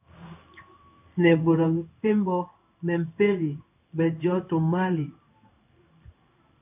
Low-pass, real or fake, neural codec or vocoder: 3.6 kHz; fake; codec, 16 kHz in and 24 kHz out, 1 kbps, XY-Tokenizer